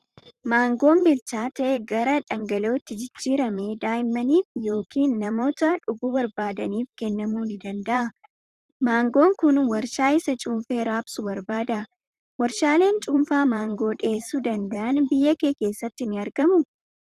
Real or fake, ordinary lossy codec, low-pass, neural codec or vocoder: fake; Opus, 64 kbps; 14.4 kHz; vocoder, 44.1 kHz, 128 mel bands, Pupu-Vocoder